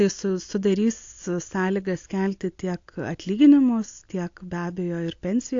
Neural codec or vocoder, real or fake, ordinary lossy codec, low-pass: none; real; AAC, 48 kbps; 7.2 kHz